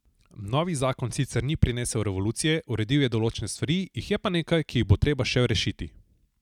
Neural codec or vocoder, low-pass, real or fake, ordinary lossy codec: none; 19.8 kHz; real; none